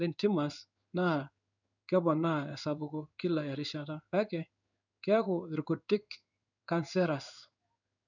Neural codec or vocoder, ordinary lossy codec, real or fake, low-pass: codec, 16 kHz in and 24 kHz out, 1 kbps, XY-Tokenizer; none; fake; 7.2 kHz